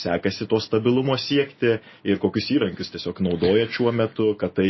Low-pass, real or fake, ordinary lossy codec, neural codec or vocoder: 7.2 kHz; real; MP3, 24 kbps; none